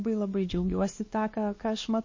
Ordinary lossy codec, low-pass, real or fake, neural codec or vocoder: MP3, 32 kbps; 7.2 kHz; fake; codec, 16 kHz, 2 kbps, X-Codec, WavLM features, trained on Multilingual LibriSpeech